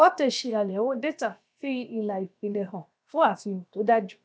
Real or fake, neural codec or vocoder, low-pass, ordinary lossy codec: fake; codec, 16 kHz, about 1 kbps, DyCAST, with the encoder's durations; none; none